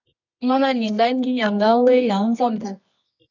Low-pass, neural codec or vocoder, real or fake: 7.2 kHz; codec, 24 kHz, 0.9 kbps, WavTokenizer, medium music audio release; fake